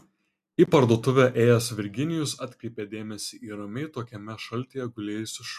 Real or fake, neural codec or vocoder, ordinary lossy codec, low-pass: real; none; MP3, 96 kbps; 14.4 kHz